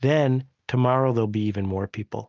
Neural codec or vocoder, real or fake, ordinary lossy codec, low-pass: none; real; Opus, 24 kbps; 7.2 kHz